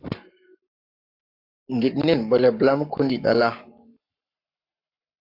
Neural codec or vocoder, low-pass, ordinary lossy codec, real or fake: codec, 44.1 kHz, 7.8 kbps, Pupu-Codec; 5.4 kHz; AAC, 48 kbps; fake